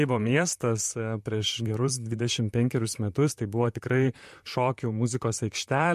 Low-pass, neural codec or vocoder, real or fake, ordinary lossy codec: 14.4 kHz; vocoder, 44.1 kHz, 128 mel bands, Pupu-Vocoder; fake; MP3, 64 kbps